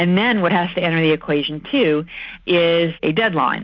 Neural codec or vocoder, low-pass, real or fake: none; 7.2 kHz; real